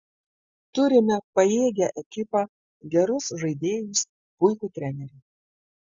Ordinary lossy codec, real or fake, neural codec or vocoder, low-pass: Opus, 64 kbps; real; none; 7.2 kHz